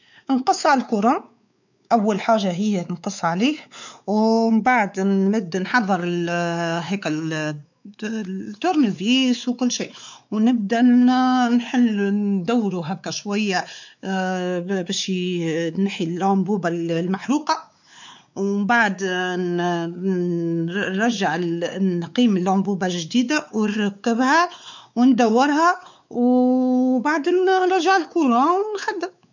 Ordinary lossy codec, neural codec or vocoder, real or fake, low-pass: none; codec, 16 kHz, 4 kbps, X-Codec, WavLM features, trained on Multilingual LibriSpeech; fake; 7.2 kHz